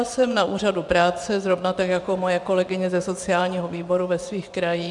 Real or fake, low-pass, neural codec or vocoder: fake; 10.8 kHz; vocoder, 24 kHz, 100 mel bands, Vocos